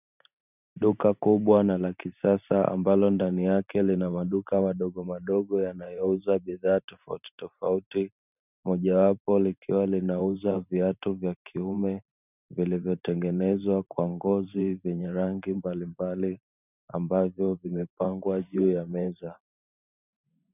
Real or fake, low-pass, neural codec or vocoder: fake; 3.6 kHz; vocoder, 44.1 kHz, 128 mel bands every 512 samples, BigVGAN v2